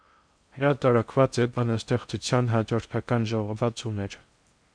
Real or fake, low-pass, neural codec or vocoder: fake; 9.9 kHz; codec, 16 kHz in and 24 kHz out, 0.6 kbps, FocalCodec, streaming, 2048 codes